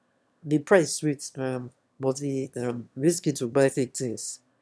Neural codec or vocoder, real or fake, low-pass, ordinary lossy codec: autoencoder, 22.05 kHz, a latent of 192 numbers a frame, VITS, trained on one speaker; fake; none; none